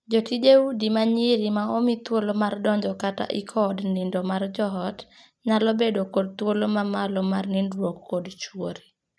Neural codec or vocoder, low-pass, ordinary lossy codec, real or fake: none; none; none; real